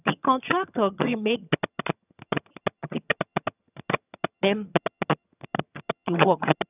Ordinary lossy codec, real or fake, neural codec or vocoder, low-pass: none; fake; vocoder, 22.05 kHz, 80 mel bands, HiFi-GAN; 3.6 kHz